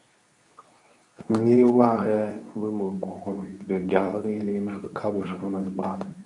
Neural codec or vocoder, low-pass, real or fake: codec, 24 kHz, 0.9 kbps, WavTokenizer, medium speech release version 2; 10.8 kHz; fake